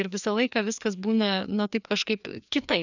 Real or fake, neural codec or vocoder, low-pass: fake; codec, 16 kHz, 2 kbps, FreqCodec, larger model; 7.2 kHz